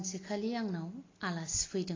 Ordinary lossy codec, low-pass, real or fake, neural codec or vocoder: AAC, 32 kbps; 7.2 kHz; real; none